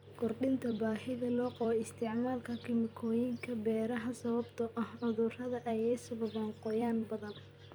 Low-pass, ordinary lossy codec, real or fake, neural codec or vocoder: none; none; fake; vocoder, 44.1 kHz, 128 mel bands every 256 samples, BigVGAN v2